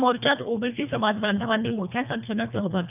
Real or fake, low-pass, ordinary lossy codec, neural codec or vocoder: fake; 3.6 kHz; none; codec, 24 kHz, 1.5 kbps, HILCodec